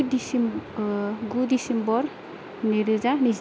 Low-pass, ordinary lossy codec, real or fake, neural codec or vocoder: none; none; real; none